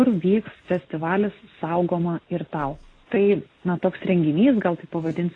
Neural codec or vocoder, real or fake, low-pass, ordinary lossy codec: none; real; 9.9 kHz; AAC, 32 kbps